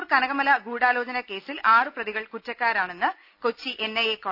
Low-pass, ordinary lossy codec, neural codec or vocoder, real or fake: 5.4 kHz; none; none; real